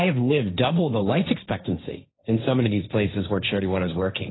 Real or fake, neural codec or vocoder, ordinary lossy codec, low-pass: fake; codec, 16 kHz, 1.1 kbps, Voila-Tokenizer; AAC, 16 kbps; 7.2 kHz